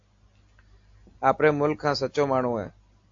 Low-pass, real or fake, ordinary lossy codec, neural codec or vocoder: 7.2 kHz; real; AAC, 48 kbps; none